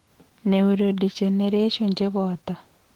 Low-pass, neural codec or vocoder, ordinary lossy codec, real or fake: 19.8 kHz; autoencoder, 48 kHz, 128 numbers a frame, DAC-VAE, trained on Japanese speech; Opus, 16 kbps; fake